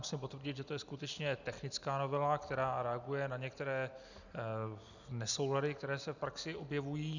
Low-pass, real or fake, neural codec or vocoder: 7.2 kHz; real; none